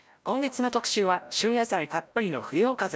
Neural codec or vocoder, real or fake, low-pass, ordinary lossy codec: codec, 16 kHz, 0.5 kbps, FreqCodec, larger model; fake; none; none